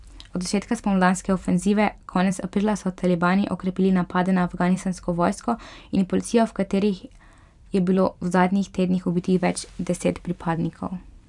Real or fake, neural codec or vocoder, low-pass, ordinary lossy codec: fake; vocoder, 44.1 kHz, 128 mel bands every 256 samples, BigVGAN v2; 10.8 kHz; none